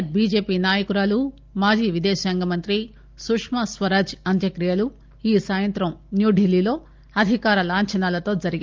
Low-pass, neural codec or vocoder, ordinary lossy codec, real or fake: 7.2 kHz; none; Opus, 24 kbps; real